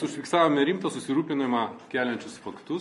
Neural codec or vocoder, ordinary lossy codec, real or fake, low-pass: none; MP3, 48 kbps; real; 14.4 kHz